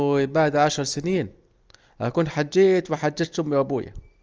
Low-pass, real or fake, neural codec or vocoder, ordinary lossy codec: 7.2 kHz; real; none; Opus, 24 kbps